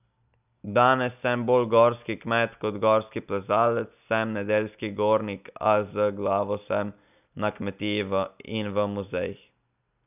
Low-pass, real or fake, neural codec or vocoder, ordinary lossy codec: 3.6 kHz; real; none; none